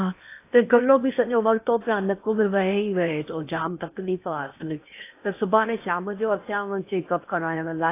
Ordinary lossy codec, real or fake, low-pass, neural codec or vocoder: AAC, 24 kbps; fake; 3.6 kHz; codec, 16 kHz in and 24 kHz out, 0.8 kbps, FocalCodec, streaming, 65536 codes